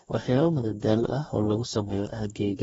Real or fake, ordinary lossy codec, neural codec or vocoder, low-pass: fake; AAC, 24 kbps; codec, 44.1 kHz, 2.6 kbps, DAC; 19.8 kHz